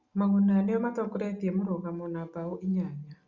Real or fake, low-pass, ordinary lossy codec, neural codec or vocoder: real; 7.2 kHz; Opus, 64 kbps; none